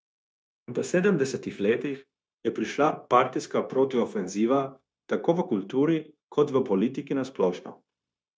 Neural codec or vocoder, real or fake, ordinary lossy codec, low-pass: codec, 16 kHz, 0.9 kbps, LongCat-Audio-Codec; fake; none; none